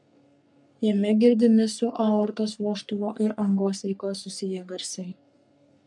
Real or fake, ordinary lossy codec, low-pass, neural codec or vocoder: fake; MP3, 96 kbps; 10.8 kHz; codec, 44.1 kHz, 3.4 kbps, Pupu-Codec